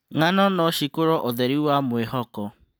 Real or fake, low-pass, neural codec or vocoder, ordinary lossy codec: real; none; none; none